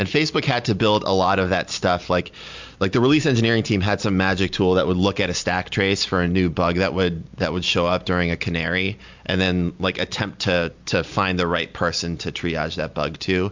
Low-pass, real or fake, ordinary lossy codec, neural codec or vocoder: 7.2 kHz; real; MP3, 64 kbps; none